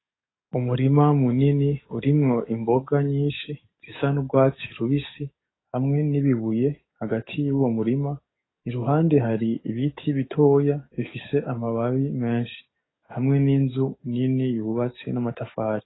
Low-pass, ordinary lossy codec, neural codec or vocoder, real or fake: 7.2 kHz; AAC, 16 kbps; codec, 44.1 kHz, 7.8 kbps, DAC; fake